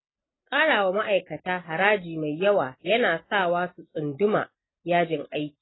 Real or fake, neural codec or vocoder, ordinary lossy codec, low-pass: real; none; AAC, 16 kbps; 7.2 kHz